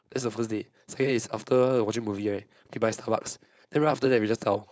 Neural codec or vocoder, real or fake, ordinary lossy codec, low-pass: codec, 16 kHz, 4.8 kbps, FACodec; fake; none; none